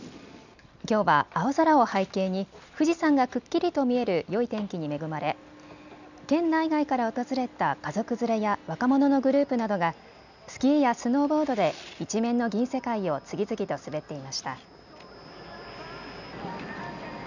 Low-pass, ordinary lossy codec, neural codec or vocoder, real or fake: 7.2 kHz; none; none; real